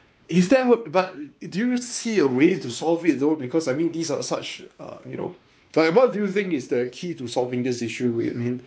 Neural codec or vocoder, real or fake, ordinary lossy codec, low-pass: codec, 16 kHz, 2 kbps, X-Codec, WavLM features, trained on Multilingual LibriSpeech; fake; none; none